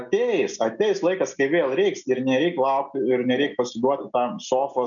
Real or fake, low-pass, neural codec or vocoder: real; 7.2 kHz; none